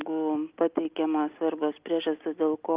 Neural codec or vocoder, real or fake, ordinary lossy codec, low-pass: none; real; Opus, 24 kbps; 3.6 kHz